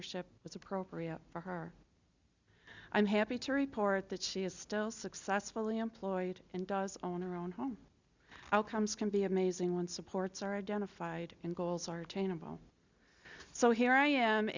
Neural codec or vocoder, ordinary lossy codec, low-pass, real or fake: none; Opus, 64 kbps; 7.2 kHz; real